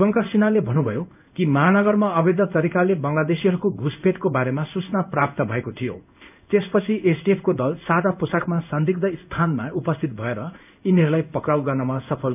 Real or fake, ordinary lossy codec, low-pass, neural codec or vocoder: fake; none; 3.6 kHz; codec, 16 kHz in and 24 kHz out, 1 kbps, XY-Tokenizer